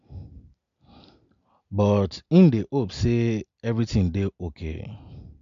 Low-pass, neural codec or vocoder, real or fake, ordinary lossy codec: 7.2 kHz; none; real; MP3, 64 kbps